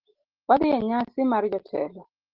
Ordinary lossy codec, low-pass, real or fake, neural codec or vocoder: Opus, 16 kbps; 5.4 kHz; real; none